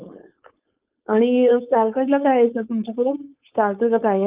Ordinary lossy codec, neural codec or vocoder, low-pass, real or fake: Opus, 24 kbps; codec, 16 kHz, 4.8 kbps, FACodec; 3.6 kHz; fake